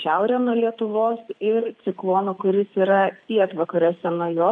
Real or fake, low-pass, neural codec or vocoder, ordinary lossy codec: fake; 9.9 kHz; codec, 24 kHz, 6 kbps, HILCodec; MP3, 64 kbps